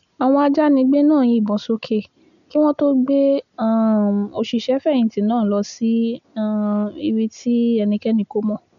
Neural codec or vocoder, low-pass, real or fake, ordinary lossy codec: none; 7.2 kHz; real; none